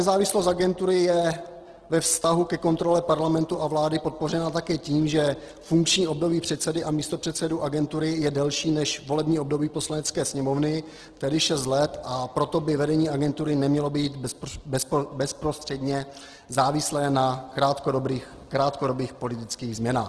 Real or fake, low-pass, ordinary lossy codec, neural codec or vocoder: real; 10.8 kHz; Opus, 16 kbps; none